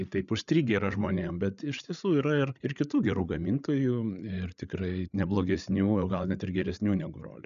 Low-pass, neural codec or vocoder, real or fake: 7.2 kHz; codec, 16 kHz, 8 kbps, FreqCodec, larger model; fake